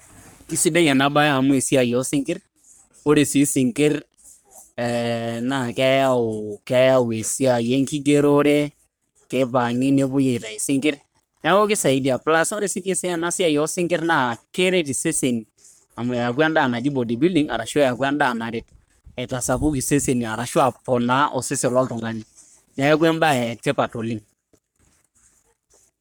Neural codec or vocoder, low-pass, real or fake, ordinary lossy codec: codec, 44.1 kHz, 3.4 kbps, Pupu-Codec; none; fake; none